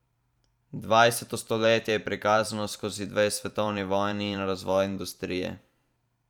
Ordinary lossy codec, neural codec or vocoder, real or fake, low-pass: none; vocoder, 44.1 kHz, 128 mel bands every 512 samples, BigVGAN v2; fake; 19.8 kHz